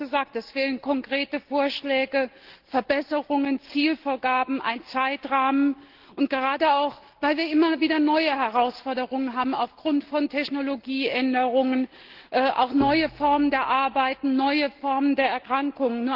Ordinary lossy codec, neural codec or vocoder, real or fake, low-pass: Opus, 16 kbps; none; real; 5.4 kHz